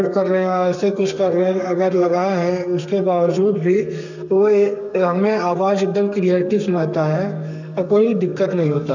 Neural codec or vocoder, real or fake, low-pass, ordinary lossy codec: codec, 32 kHz, 1.9 kbps, SNAC; fake; 7.2 kHz; none